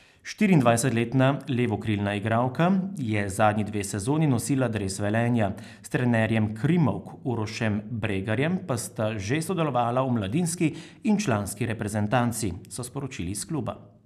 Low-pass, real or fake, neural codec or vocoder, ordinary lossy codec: 14.4 kHz; real; none; none